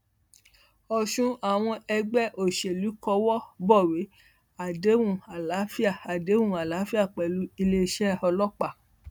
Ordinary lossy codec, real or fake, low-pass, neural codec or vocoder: none; real; none; none